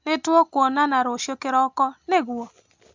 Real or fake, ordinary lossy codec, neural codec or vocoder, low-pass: real; MP3, 64 kbps; none; 7.2 kHz